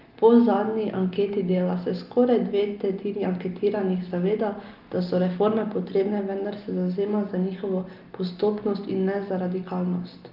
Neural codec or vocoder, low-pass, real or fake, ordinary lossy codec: none; 5.4 kHz; real; Opus, 32 kbps